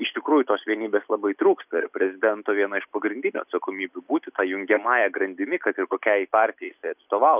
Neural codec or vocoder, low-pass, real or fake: none; 3.6 kHz; real